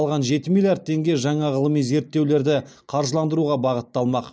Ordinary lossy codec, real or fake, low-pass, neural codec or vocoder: none; real; none; none